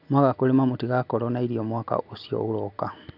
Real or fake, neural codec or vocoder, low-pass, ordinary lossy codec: real; none; 5.4 kHz; none